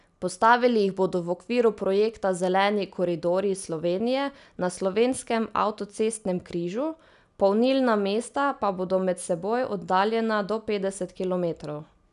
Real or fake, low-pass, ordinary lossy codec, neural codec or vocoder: real; 10.8 kHz; none; none